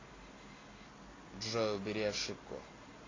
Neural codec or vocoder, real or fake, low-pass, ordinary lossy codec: none; real; 7.2 kHz; AAC, 32 kbps